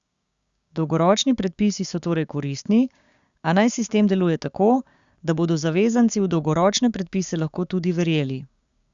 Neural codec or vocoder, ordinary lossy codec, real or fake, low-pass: codec, 16 kHz, 6 kbps, DAC; Opus, 64 kbps; fake; 7.2 kHz